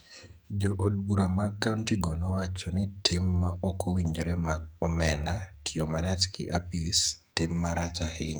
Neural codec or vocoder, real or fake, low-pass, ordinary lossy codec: codec, 44.1 kHz, 2.6 kbps, SNAC; fake; none; none